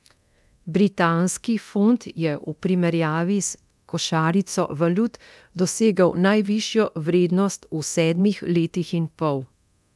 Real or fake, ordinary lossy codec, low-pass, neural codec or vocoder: fake; none; none; codec, 24 kHz, 0.9 kbps, DualCodec